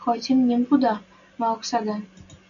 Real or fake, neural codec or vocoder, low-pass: real; none; 7.2 kHz